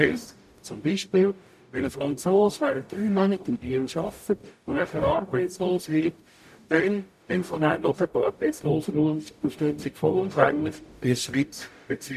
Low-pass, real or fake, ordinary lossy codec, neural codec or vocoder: 14.4 kHz; fake; none; codec, 44.1 kHz, 0.9 kbps, DAC